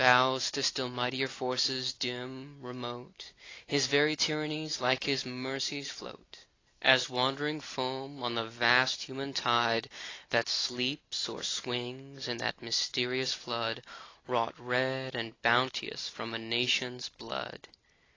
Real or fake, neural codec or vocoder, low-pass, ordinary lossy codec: real; none; 7.2 kHz; AAC, 32 kbps